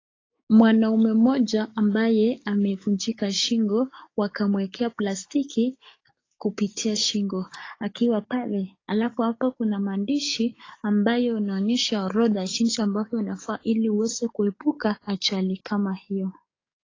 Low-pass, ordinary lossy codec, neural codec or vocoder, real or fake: 7.2 kHz; AAC, 32 kbps; codec, 44.1 kHz, 7.8 kbps, DAC; fake